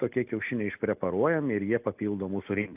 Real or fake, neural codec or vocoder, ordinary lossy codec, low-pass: real; none; AAC, 32 kbps; 3.6 kHz